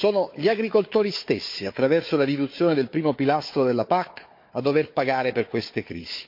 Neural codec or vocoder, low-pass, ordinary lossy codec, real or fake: codec, 16 kHz, 4 kbps, FunCodec, trained on Chinese and English, 50 frames a second; 5.4 kHz; MP3, 32 kbps; fake